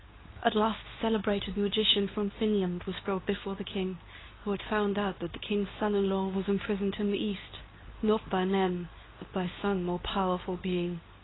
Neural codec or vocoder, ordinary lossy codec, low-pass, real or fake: codec, 24 kHz, 0.9 kbps, WavTokenizer, medium speech release version 2; AAC, 16 kbps; 7.2 kHz; fake